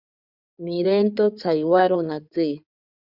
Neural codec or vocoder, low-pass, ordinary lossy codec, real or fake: codec, 16 kHz in and 24 kHz out, 2.2 kbps, FireRedTTS-2 codec; 5.4 kHz; Opus, 64 kbps; fake